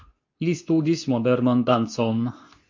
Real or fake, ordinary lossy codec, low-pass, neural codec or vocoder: fake; MP3, 48 kbps; 7.2 kHz; codec, 24 kHz, 0.9 kbps, WavTokenizer, medium speech release version 2